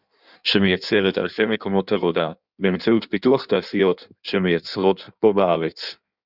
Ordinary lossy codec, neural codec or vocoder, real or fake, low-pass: Opus, 64 kbps; codec, 16 kHz in and 24 kHz out, 1.1 kbps, FireRedTTS-2 codec; fake; 5.4 kHz